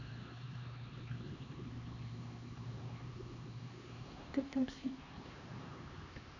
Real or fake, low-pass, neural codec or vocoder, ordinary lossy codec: fake; 7.2 kHz; codec, 16 kHz, 2 kbps, X-Codec, HuBERT features, trained on LibriSpeech; none